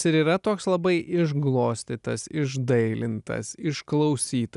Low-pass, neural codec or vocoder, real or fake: 10.8 kHz; none; real